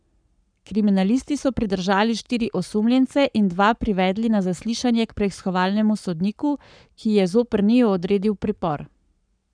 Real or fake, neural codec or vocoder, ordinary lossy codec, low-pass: fake; codec, 44.1 kHz, 7.8 kbps, Pupu-Codec; none; 9.9 kHz